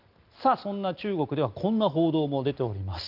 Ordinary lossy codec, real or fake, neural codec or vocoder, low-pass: Opus, 32 kbps; real; none; 5.4 kHz